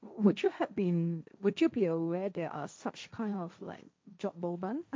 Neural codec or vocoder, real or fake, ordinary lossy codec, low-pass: codec, 16 kHz, 1.1 kbps, Voila-Tokenizer; fake; none; none